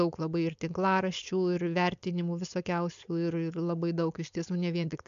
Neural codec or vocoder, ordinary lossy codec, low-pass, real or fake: codec, 16 kHz, 4.8 kbps, FACodec; MP3, 64 kbps; 7.2 kHz; fake